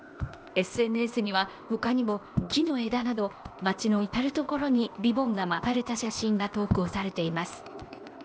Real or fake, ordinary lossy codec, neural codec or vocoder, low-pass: fake; none; codec, 16 kHz, 0.8 kbps, ZipCodec; none